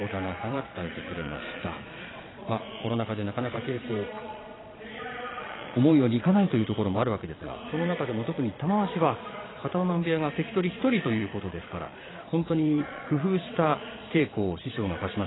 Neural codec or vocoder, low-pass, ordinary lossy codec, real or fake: vocoder, 22.05 kHz, 80 mel bands, Vocos; 7.2 kHz; AAC, 16 kbps; fake